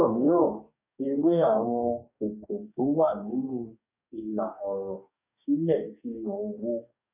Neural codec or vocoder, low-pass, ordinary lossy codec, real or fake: codec, 44.1 kHz, 2.6 kbps, DAC; 3.6 kHz; none; fake